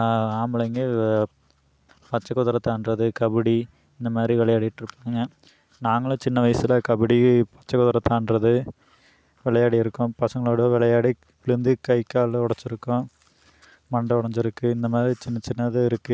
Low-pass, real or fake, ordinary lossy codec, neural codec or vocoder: none; real; none; none